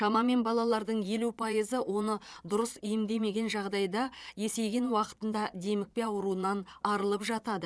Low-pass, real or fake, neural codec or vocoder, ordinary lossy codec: none; fake; vocoder, 22.05 kHz, 80 mel bands, Vocos; none